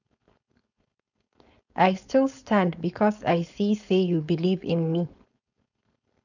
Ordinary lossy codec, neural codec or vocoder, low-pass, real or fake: none; codec, 16 kHz, 4.8 kbps, FACodec; 7.2 kHz; fake